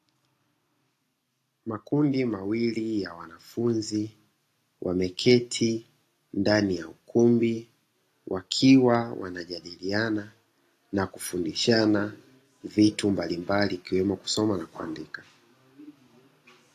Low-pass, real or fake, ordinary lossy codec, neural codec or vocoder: 14.4 kHz; real; AAC, 48 kbps; none